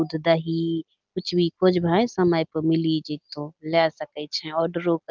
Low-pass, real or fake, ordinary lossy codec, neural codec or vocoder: 7.2 kHz; real; Opus, 32 kbps; none